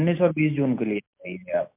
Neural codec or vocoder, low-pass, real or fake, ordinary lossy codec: none; 3.6 kHz; real; MP3, 32 kbps